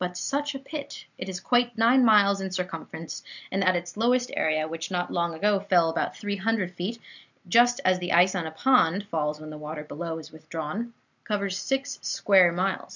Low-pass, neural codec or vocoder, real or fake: 7.2 kHz; none; real